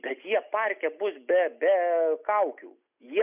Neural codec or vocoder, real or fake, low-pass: none; real; 3.6 kHz